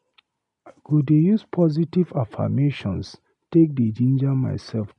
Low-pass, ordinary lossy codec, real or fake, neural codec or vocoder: 10.8 kHz; none; real; none